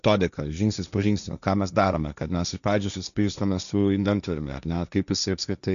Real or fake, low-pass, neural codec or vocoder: fake; 7.2 kHz; codec, 16 kHz, 1.1 kbps, Voila-Tokenizer